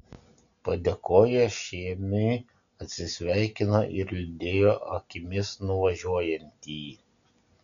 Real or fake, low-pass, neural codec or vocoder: real; 7.2 kHz; none